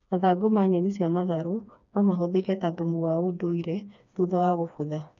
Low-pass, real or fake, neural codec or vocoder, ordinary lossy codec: 7.2 kHz; fake; codec, 16 kHz, 2 kbps, FreqCodec, smaller model; none